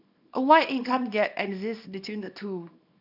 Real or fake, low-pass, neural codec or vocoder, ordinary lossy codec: fake; 5.4 kHz; codec, 24 kHz, 0.9 kbps, WavTokenizer, small release; none